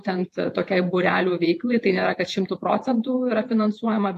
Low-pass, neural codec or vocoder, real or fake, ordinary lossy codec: 14.4 kHz; vocoder, 48 kHz, 128 mel bands, Vocos; fake; AAC, 64 kbps